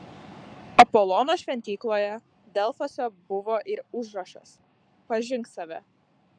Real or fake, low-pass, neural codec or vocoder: fake; 9.9 kHz; codec, 44.1 kHz, 7.8 kbps, Pupu-Codec